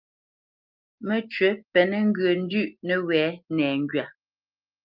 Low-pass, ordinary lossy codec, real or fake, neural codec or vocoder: 5.4 kHz; Opus, 24 kbps; real; none